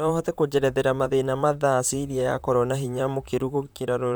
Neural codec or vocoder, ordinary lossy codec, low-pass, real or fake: vocoder, 44.1 kHz, 128 mel bands, Pupu-Vocoder; none; none; fake